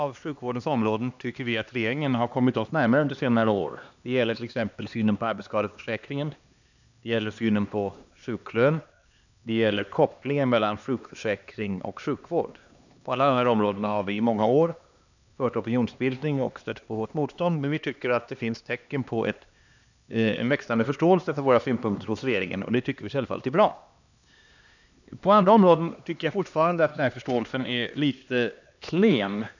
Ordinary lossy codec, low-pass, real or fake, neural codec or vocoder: none; 7.2 kHz; fake; codec, 16 kHz, 2 kbps, X-Codec, HuBERT features, trained on LibriSpeech